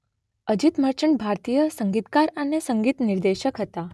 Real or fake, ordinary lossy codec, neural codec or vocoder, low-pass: real; none; none; none